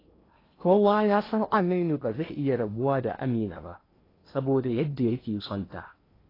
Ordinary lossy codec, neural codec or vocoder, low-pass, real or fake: AAC, 24 kbps; codec, 16 kHz in and 24 kHz out, 0.8 kbps, FocalCodec, streaming, 65536 codes; 5.4 kHz; fake